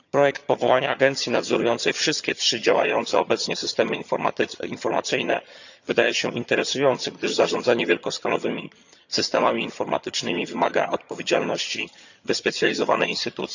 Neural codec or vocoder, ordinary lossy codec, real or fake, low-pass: vocoder, 22.05 kHz, 80 mel bands, HiFi-GAN; none; fake; 7.2 kHz